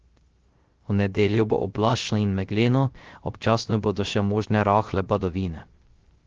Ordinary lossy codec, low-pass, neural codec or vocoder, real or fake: Opus, 16 kbps; 7.2 kHz; codec, 16 kHz, 0.3 kbps, FocalCodec; fake